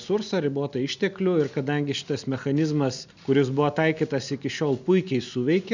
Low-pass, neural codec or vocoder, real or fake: 7.2 kHz; none; real